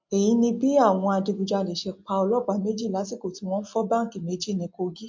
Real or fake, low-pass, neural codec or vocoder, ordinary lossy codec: real; 7.2 kHz; none; MP3, 48 kbps